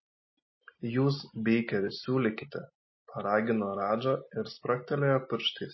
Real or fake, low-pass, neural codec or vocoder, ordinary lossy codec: real; 7.2 kHz; none; MP3, 24 kbps